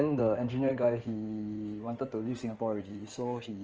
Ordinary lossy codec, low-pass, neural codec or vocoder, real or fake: Opus, 24 kbps; 7.2 kHz; codec, 16 kHz in and 24 kHz out, 2.2 kbps, FireRedTTS-2 codec; fake